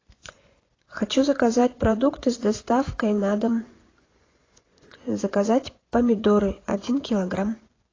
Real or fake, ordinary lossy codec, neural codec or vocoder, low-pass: fake; AAC, 32 kbps; vocoder, 24 kHz, 100 mel bands, Vocos; 7.2 kHz